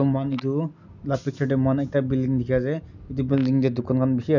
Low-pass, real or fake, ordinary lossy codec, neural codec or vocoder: 7.2 kHz; real; none; none